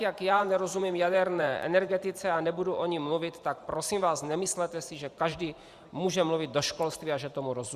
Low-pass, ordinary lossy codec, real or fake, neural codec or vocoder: 14.4 kHz; Opus, 64 kbps; fake; vocoder, 44.1 kHz, 128 mel bands every 512 samples, BigVGAN v2